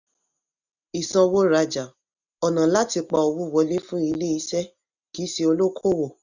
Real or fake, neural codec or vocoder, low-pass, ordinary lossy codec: real; none; 7.2 kHz; none